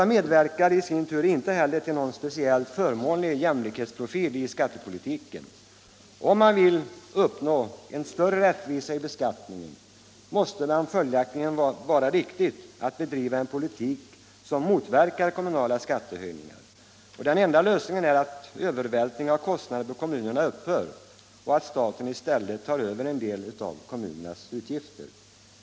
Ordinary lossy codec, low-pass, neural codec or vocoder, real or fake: none; none; none; real